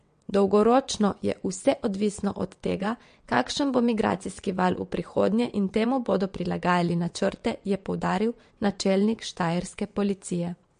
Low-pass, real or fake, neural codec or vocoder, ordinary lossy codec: 9.9 kHz; fake; vocoder, 22.05 kHz, 80 mel bands, WaveNeXt; MP3, 48 kbps